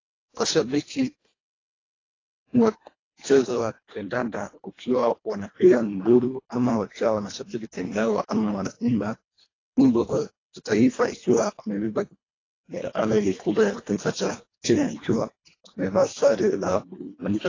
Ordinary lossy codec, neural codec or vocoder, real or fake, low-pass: AAC, 32 kbps; codec, 24 kHz, 1.5 kbps, HILCodec; fake; 7.2 kHz